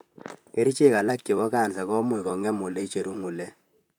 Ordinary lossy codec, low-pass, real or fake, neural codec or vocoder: none; none; fake; vocoder, 44.1 kHz, 128 mel bands, Pupu-Vocoder